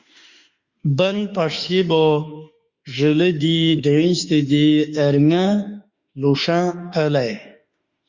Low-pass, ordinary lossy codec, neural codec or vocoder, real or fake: 7.2 kHz; Opus, 64 kbps; autoencoder, 48 kHz, 32 numbers a frame, DAC-VAE, trained on Japanese speech; fake